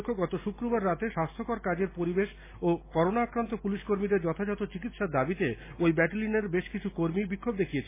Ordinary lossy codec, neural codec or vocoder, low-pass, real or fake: MP3, 16 kbps; none; 3.6 kHz; real